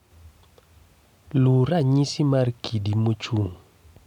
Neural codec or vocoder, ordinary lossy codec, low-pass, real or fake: none; none; 19.8 kHz; real